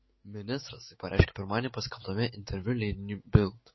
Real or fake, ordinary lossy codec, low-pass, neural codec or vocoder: fake; MP3, 24 kbps; 7.2 kHz; autoencoder, 48 kHz, 128 numbers a frame, DAC-VAE, trained on Japanese speech